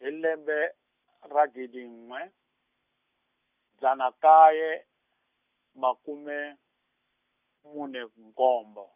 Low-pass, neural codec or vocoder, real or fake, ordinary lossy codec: 3.6 kHz; none; real; none